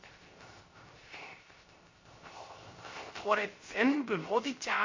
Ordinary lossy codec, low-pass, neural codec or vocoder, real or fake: MP3, 32 kbps; 7.2 kHz; codec, 16 kHz, 0.3 kbps, FocalCodec; fake